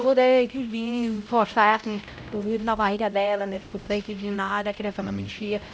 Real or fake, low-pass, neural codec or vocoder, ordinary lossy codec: fake; none; codec, 16 kHz, 0.5 kbps, X-Codec, HuBERT features, trained on LibriSpeech; none